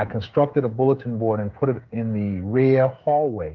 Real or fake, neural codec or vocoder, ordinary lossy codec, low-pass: fake; codec, 16 kHz, 16 kbps, FreqCodec, smaller model; Opus, 32 kbps; 7.2 kHz